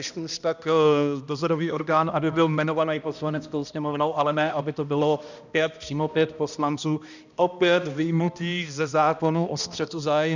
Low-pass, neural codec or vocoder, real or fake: 7.2 kHz; codec, 16 kHz, 1 kbps, X-Codec, HuBERT features, trained on balanced general audio; fake